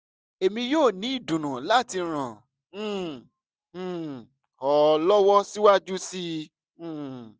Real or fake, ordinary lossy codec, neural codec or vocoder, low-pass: real; none; none; none